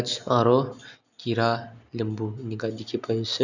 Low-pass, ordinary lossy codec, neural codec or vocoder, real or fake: 7.2 kHz; none; none; real